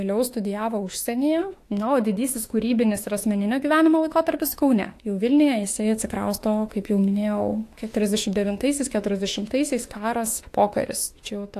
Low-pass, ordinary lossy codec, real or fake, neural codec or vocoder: 14.4 kHz; AAC, 64 kbps; fake; autoencoder, 48 kHz, 32 numbers a frame, DAC-VAE, trained on Japanese speech